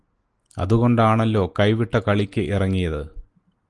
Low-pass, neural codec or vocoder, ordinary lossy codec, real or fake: 10.8 kHz; none; Opus, 24 kbps; real